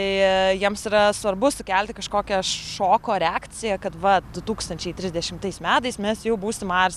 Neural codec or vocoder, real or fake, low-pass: none; real; 14.4 kHz